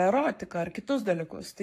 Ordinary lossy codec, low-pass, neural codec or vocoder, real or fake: AAC, 64 kbps; 14.4 kHz; codec, 44.1 kHz, 7.8 kbps, Pupu-Codec; fake